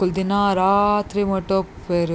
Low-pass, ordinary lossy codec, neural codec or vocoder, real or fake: none; none; none; real